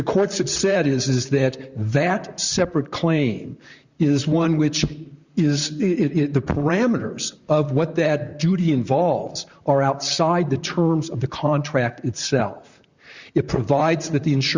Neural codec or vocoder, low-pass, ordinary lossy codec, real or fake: vocoder, 44.1 kHz, 128 mel bands, Pupu-Vocoder; 7.2 kHz; Opus, 64 kbps; fake